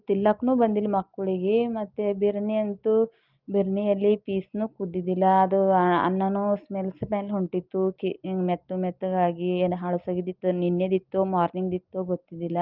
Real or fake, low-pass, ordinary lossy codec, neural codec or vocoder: real; 5.4 kHz; Opus, 32 kbps; none